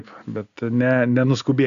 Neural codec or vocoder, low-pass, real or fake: none; 7.2 kHz; real